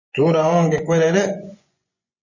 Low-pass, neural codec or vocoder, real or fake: 7.2 kHz; vocoder, 24 kHz, 100 mel bands, Vocos; fake